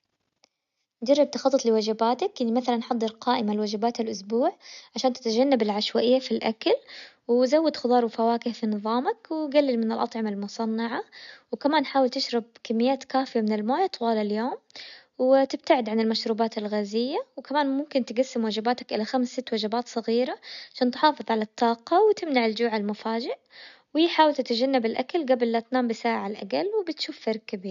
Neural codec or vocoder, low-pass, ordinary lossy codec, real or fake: none; 7.2 kHz; none; real